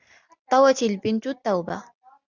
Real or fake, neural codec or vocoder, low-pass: real; none; 7.2 kHz